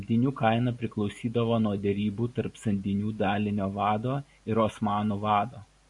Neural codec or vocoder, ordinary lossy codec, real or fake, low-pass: none; AAC, 64 kbps; real; 10.8 kHz